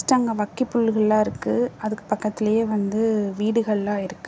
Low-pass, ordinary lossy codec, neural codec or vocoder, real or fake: none; none; none; real